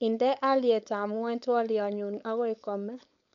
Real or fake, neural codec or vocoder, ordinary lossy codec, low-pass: fake; codec, 16 kHz, 4.8 kbps, FACodec; none; 7.2 kHz